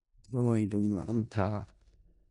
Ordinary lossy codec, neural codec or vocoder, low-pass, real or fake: none; codec, 16 kHz in and 24 kHz out, 0.4 kbps, LongCat-Audio-Codec, four codebook decoder; 10.8 kHz; fake